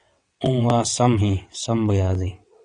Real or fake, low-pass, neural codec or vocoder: fake; 9.9 kHz; vocoder, 22.05 kHz, 80 mel bands, WaveNeXt